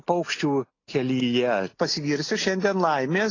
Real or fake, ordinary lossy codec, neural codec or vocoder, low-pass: real; AAC, 32 kbps; none; 7.2 kHz